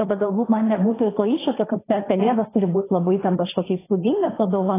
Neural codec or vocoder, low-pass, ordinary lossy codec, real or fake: codec, 16 kHz, 1.1 kbps, Voila-Tokenizer; 3.6 kHz; AAC, 16 kbps; fake